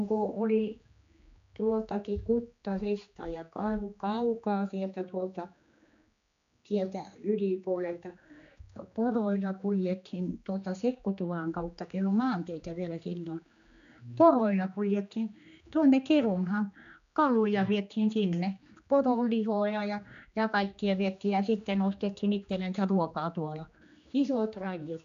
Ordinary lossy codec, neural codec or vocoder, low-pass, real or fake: AAC, 96 kbps; codec, 16 kHz, 2 kbps, X-Codec, HuBERT features, trained on general audio; 7.2 kHz; fake